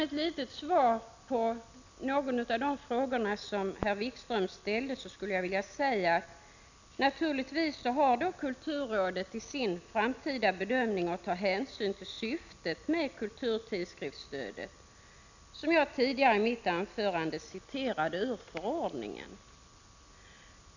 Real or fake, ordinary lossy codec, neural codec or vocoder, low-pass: real; none; none; 7.2 kHz